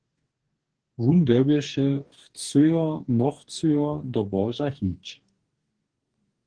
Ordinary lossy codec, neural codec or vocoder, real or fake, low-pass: Opus, 16 kbps; codec, 44.1 kHz, 2.6 kbps, DAC; fake; 9.9 kHz